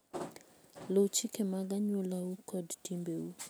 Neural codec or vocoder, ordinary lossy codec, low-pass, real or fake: none; none; none; real